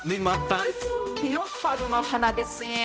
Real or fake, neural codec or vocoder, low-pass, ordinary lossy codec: fake; codec, 16 kHz, 0.5 kbps, X-Codec, HuBERT features, trained on balanced general audio; none; none